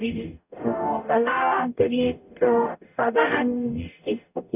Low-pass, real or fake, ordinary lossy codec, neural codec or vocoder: 3.6 kHz; fake; none; codec, 44.1 kHz, 0.9 kbps, DAC